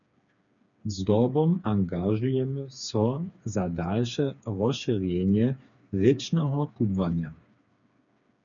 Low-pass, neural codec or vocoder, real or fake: 7.2 kHz; codec, 16 kHz, 4 kbps, FreqCodec, smaller model; fake